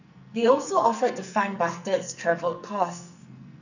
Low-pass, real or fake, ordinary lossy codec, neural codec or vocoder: 7.2 kHz; fake; none; codec, 44.1 kHz, 2.6 kbps, SNAC